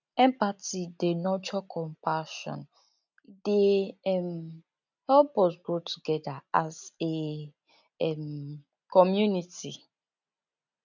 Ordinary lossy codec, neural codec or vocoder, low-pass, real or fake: none; none; 7.2 kHz; real